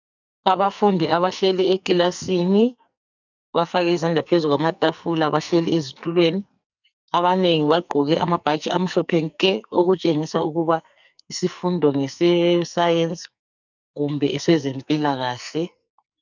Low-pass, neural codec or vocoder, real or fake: 7.2 kHz; codec, 44.1 kHz, 2.6 kbps, SNAC; fake